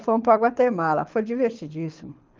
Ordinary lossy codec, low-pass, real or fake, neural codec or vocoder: Opus, 32 kbps; 7.2 kHz; fake; codec, 24 kHz, 6 kbps, HILCodec